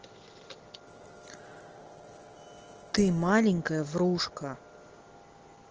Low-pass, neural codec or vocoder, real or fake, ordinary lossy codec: 7.2 kHz; none; real; Opus, 16 kbps